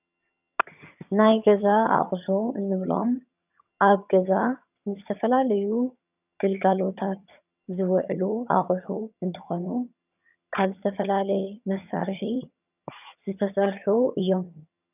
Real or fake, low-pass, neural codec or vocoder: fake; 3.6 kHz; vocoder, 22.05 kHz, 80 mel bands, HiFi-GAN